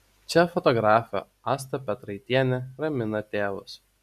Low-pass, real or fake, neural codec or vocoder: 14.4 kHz; real; none